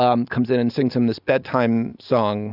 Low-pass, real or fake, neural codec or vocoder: 5.4 kHz; real; none